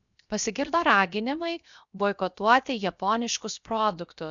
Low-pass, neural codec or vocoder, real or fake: 7.2 kHz; codec, 16 kHz, 0.7 kbps, FocalCodec; fake